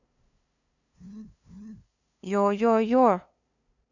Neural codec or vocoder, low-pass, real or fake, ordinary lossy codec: codec, 16 kHz, 2 kbps, FunCodec, trained on LibriTTS, 25 frames a second; 7.2 kHz; fake; none